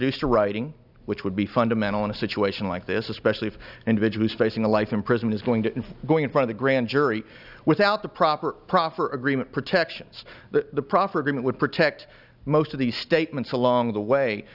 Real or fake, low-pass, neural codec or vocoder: real; 5.4 kHz; none